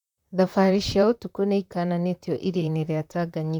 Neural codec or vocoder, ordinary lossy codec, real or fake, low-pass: vocoder, 44.1 kHz, 128 mel bands, Pupu-Vocoder; none; fake; 19.8 kHz